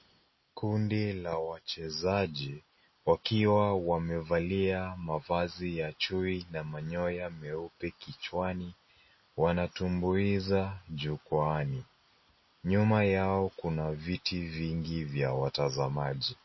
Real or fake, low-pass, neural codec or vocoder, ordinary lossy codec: real; 7.2 kHz; none; MP3, 24 kbps